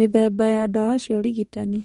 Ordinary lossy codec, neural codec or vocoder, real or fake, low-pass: MP3, 48 kbps; codec, 44.1 kHz, 2.6 kbps, DAC; fake; 19.8 kHz